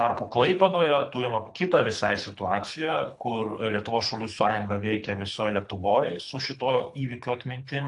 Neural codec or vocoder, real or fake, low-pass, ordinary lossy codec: codec, 24 kHz, 3 kbps, HILCodec; fake; 10.8 kHz; AAC, 64 kbps